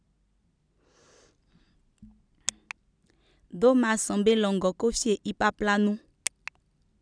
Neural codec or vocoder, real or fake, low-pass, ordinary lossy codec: none; real; 9.9 kHz; none